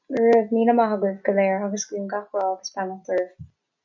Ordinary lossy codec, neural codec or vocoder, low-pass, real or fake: MP3, 64 kbps; none; 7.2 kHz; real